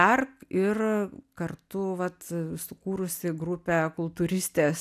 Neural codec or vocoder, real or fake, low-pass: none; real; 14.4 kHz